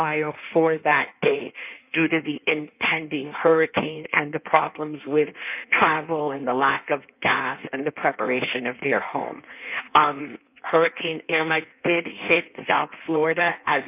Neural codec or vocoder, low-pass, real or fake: codec, 16 kHz in and 24 kHz out, 1.1 kbps, FireRedTTS-2 codec; 3.6 kHz; fake